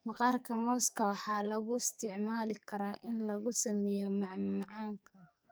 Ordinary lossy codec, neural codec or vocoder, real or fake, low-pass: none; codec, 44.1 kHz, 2.6 kbps, SNAC; fake; none